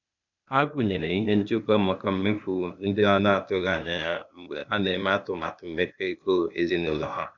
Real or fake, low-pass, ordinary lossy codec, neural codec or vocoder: fake; 7.2 kHz; none; codec, 16 kHz, 0.8 kbps, ZipCodec